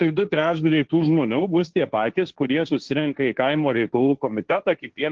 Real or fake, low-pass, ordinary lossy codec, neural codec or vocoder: fake; 7.2 kHz; Opus, 16 kbps; codec, 16 kHz, 1.1 kbps, Voila-Tokenizer